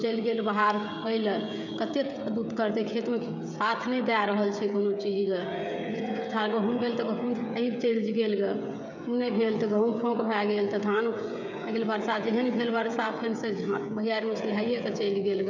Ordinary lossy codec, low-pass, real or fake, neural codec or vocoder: none; 7.2 kHz; fake; codec, 16 kHz, 16 kbps, FreqCodec, smaller model